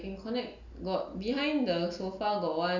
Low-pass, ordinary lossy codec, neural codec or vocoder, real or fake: 7.2 kHz; none; none; real